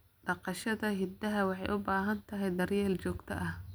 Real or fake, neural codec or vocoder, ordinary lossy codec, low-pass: real; none; none; none